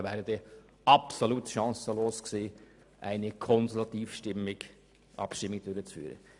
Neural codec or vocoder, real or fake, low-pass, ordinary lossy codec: none; real; 10.8 kHz; none